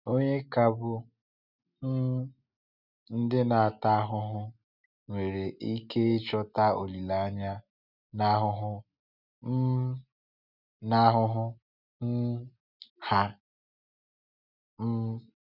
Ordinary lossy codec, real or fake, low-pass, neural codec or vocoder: none; real; 5.4 kHz; none